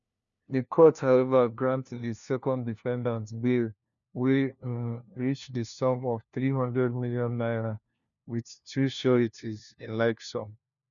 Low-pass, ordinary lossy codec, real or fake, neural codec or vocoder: 7.2 kHz; none; fake; codec, 16 kHz, 1 kbps, FunCodec, trained on LibriTTS, 50 frames a second